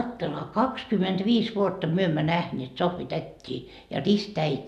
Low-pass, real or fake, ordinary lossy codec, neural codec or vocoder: 14.4 kHz; real; none; none